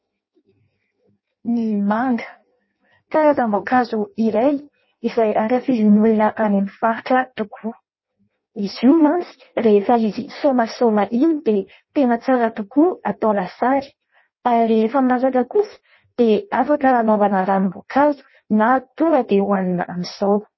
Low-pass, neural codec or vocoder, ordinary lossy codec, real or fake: 7.2 kHz; codec, 16 kHz in and 24 kHz out, 0.6 kbps, FireRedTTS-2 codec; MP3, 24 kbps; fake